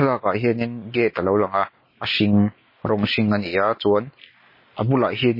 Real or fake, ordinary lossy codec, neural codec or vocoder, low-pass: real; MP3, 24 kbps; none; 5.4 kHz